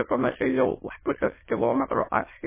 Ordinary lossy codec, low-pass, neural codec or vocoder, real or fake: MP3, 16 kbps; 3.6 kHz; autoencoder, 22.05 kHz, a latent of 192 numbers a frame, VITS, trained on many speakers; fake